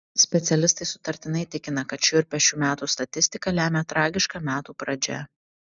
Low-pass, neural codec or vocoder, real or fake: 7.2 kHz; none; real